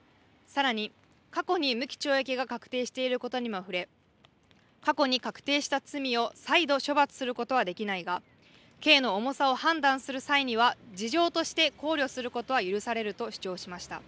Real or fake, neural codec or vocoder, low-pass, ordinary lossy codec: real; none; none; none